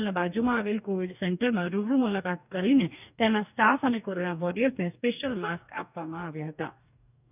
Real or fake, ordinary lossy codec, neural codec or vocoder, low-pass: fake; none; codec, 44.1 kHz, 2.6 kbps, DAC; 3.6 kHz